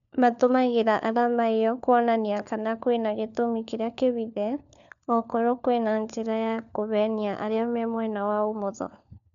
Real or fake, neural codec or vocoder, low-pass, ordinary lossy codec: fake; codec, 16 kHz, 4 kbps, FunCodec, trained on LibriTTS, 50 frames a second; 7.2 kHz; none